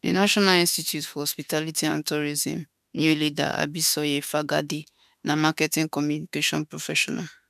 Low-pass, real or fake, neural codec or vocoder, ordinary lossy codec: 14.4 kHz; fake; autoencoder, 48 kHz, 32 numbers a frame, DAC-VAE, trained on Japanese speech; none